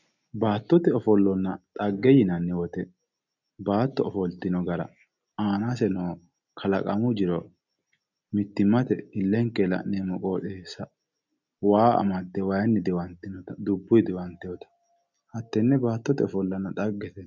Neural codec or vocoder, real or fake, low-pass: none; real; 7.2 kHz